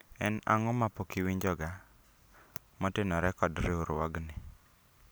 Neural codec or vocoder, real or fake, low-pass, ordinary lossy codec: none; real; none; none